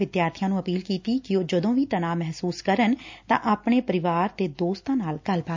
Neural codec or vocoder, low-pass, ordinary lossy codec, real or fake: none; 7.2 kHz; MP3, 64 kbps; real